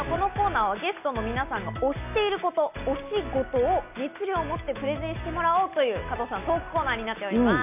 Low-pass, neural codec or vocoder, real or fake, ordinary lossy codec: 3.6 kHz; none; real; none